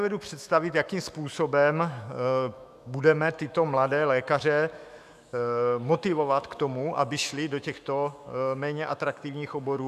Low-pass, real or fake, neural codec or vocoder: 14.4 kHz; fake; autoencoder, 48 kHz, 128 numbers a frame, DAC-VAE, trained on Japanese speech